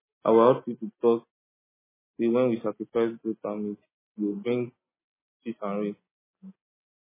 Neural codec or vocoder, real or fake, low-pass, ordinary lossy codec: none; real; 3.6 kHz; MP3, 16 kbps